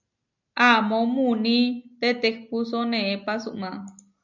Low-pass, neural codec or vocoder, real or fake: 7.2 kHz; none; real